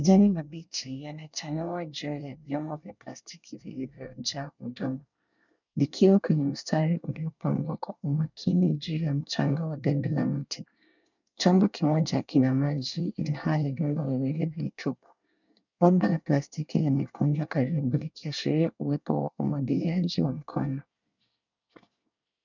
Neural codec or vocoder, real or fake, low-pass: codec, 24 kHz, 1 kbps, SNAC; fake; 7.2 kHz